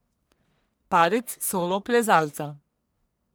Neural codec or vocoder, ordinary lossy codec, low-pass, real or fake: codec, 44.1 kHz, 1.7 kbps, Pupu-Codec; none; none; fake